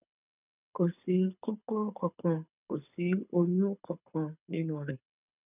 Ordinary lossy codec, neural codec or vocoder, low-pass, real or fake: none; codec, 24 kHz, 6 kbps, HILCodec; 3.6 kHz; fake